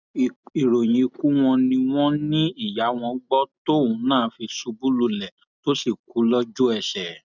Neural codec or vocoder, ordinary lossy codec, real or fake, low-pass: none; none; real; 7.2 kHz